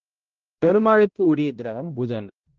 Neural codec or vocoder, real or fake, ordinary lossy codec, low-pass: codec, 16 kHz, 0.5 kbps, X-Codec, HuBERT features, trained on balanced general audio; fake; Opus, 16 kbps; 7.2 kHz